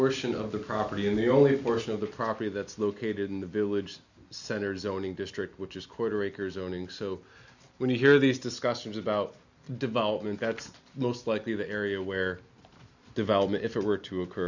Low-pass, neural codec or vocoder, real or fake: 7.2 kHz; none; real